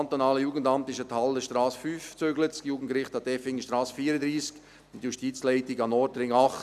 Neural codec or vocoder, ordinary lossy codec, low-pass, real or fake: none; none; 14.4 kHz; real